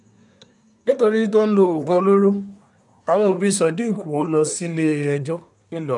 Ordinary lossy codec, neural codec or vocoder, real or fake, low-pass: none; codec, 24 kHz, 1 kbps, SNAC; fake; 10.8 kHz